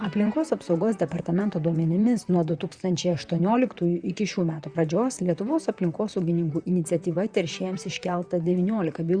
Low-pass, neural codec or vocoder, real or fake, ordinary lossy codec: 9.9 kHz; vocoder, 44.1 kHz, 128 mel bands, Pupu-Vocoder; fake; Opus, 64 kbps